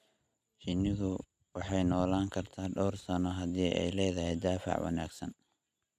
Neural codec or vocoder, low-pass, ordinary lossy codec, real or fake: none; 14.4 kHz; none; real